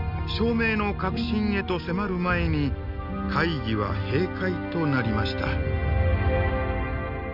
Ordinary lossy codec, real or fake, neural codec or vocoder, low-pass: none; real; none; 5.4 kHz